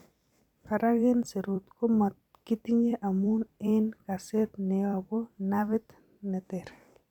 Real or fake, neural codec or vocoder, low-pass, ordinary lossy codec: fake; vocoder, 44.1 kHz, 128 mel bands every 256 samples, BigVGAN v2; 19.8 kHz; none